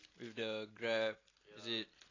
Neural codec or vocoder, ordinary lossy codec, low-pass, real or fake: none; AAC, 32 kbps; 7.2 kHz; real